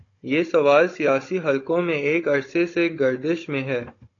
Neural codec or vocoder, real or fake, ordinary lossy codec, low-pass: codec, 16 kHz, 16 kbps, FunCodec, trained on Chinese and English, 50 frames a second; fake; AAC, 32 kbps; 7.2 kHz